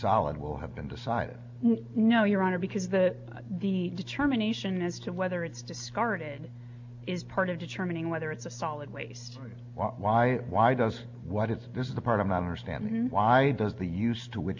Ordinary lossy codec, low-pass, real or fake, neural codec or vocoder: MP3, 64 kbps; 7.2 kHz; real; none